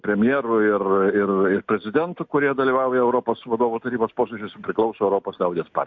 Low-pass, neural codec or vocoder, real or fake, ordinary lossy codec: 7.2 kHz; none; real; MP3, 64 kbps